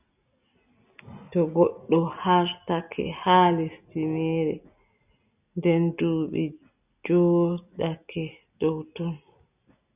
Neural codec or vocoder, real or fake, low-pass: none; real; 3.6 kHz